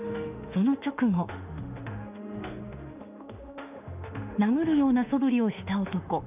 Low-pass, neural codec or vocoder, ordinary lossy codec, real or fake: 3.6 kHz; autoencoder, 48 kHz, 32 numbers a frame, DAC-VAE, trained on Japanese speech; none; fake